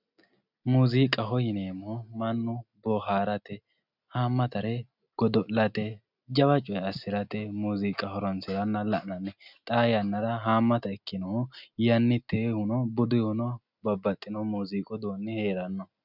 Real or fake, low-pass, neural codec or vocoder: real; 5.4 kHz; none